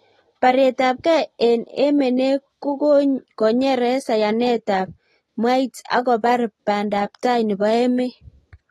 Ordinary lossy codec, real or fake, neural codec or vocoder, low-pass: AAC, 32 kbps; real; none; 19.8 kHz